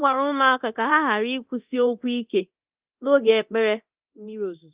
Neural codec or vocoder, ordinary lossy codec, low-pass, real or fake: codec, 24 kHz, 0.9 kbps, DualCodec; Opus, 24 kbps; 3.6 kHz; fake